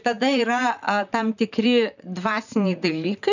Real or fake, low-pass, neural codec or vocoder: fake; 7.2 kHz; vocoder, 44.1 kHz, 128 mel bands, Pupu-Vocoder